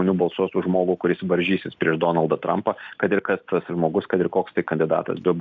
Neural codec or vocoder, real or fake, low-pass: none; real; 7.2 kHz